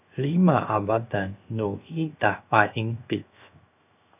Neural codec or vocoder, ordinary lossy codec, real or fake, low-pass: codec, 16 kHz, 0.7 kbps, FocalCodec; AAC, 32 kbps; fake; 3.6 kHz